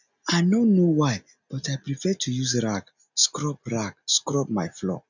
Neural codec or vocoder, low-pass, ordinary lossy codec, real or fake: none; 7.2 kHz; none; real